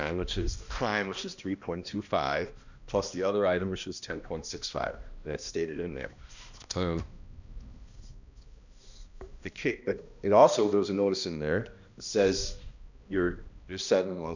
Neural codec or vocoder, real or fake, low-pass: codec, 16 kHz, 1 kbps, X-Codec, HuBERT features, trained on balanced general audio; fake; 7.2 kHz